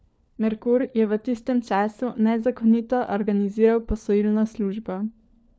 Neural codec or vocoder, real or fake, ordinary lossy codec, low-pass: codec, 16 kHz, 4 kbps, FunCodec, trained on LibriTTS, 50 frames a second; fake; none; none